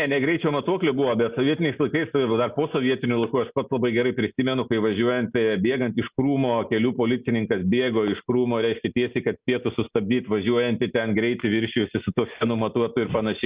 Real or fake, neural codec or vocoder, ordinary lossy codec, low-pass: real; none; Opus, 64 kbps; 3.6 kHz